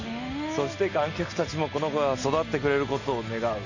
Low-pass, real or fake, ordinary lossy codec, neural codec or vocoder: 7.2 kHz; real; none; none